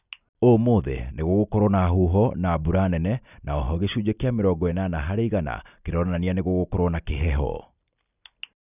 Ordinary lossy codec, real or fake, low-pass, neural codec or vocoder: none; real; 3.6 kHz; none